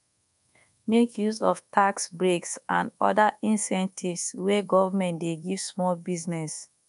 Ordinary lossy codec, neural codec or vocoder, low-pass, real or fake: none; codec, 24 kHz, 1.2 kbps, DualCodec; 10.8 kHz; fake